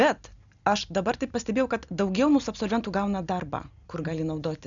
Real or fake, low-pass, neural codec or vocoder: real; 7.2 kHz; none